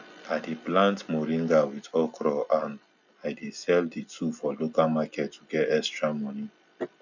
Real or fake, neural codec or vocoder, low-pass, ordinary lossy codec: real; none; 7.2 kHz; none